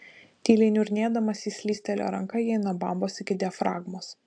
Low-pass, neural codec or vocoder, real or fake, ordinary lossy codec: 9.9 kHz; none; real; AAC, 64 kbps